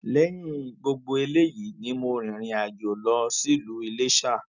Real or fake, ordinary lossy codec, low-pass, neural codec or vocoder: real; none; 7.2 kHz; none